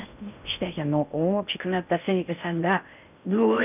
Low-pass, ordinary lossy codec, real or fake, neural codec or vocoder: 3.6 kHz; none; fake; codec, 16 kHz in and 24 kHz out, 0.6 kbps, FocalCodec, streaming, 4096 codes